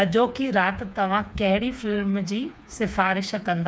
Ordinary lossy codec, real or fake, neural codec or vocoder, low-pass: none; fake; codec, 16 kHz, 4 kbps, FreqCodec, smaller model; none